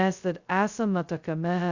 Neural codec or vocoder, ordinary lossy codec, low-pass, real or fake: codec, 16 kHz, 0.2 kbps, FocalCodec; Opus, 64 kbps; 7.2 kHz; fake